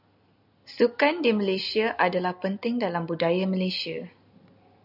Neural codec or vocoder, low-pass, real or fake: none; 5.4 kHz; real